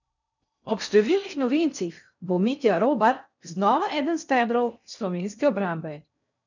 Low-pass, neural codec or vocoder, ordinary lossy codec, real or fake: 7.2 kHz; codec, 16 kHz in and 24 kHz out, 0.6 kbps, FocalCodec, streaming, 4096 codes; none; fake